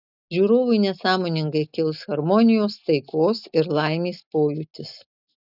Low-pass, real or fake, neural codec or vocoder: 5.4 kHz; real; none